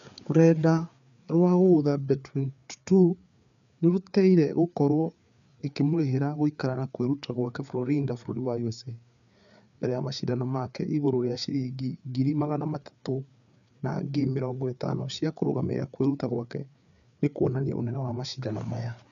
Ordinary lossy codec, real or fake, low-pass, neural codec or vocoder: none; fake; 7.2 kHz; codec, 16 kHz, 4 kbps, FreqCodec, larger model